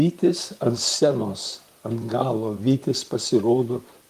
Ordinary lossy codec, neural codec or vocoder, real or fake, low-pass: Opus, 32 kbps; vocoder, 44.1 kHz, 128 mel bands, Pupu-Vocoder; fake; 14.4 kHz